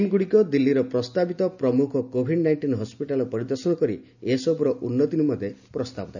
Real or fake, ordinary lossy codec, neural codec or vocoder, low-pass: real; none; none; 7.2 kHz